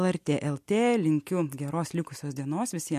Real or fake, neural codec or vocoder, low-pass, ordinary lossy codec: real; none; 14.4 kHz; MP3, 64 kbps